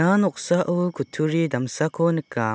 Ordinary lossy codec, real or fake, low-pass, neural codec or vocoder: none; real; none; none